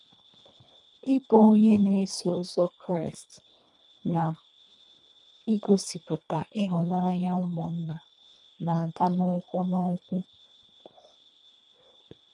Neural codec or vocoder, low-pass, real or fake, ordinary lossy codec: codec, 24 kHz, 1.5 kbps, HILCodec; 10.8 kHz; fake; none